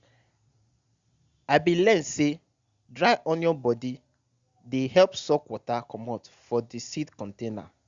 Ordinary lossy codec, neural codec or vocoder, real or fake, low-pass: Opus, 64 kbps; none; real; 7.2 kHz